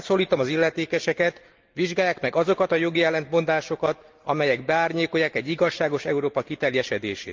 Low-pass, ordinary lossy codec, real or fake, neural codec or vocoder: 7.2 kHz; Opus, 32 kbps; real; none